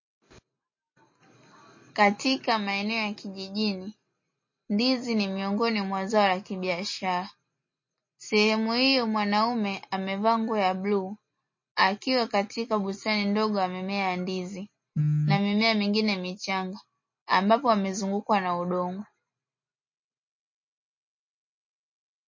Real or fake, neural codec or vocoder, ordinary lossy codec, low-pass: real; none; MP3, 32 kbps; 7.2 kHz